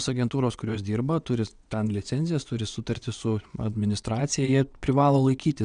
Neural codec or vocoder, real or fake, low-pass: vocoder, 22.05 kHz, 80 mel bands, WaveNeXt; fake; 9.9 kHz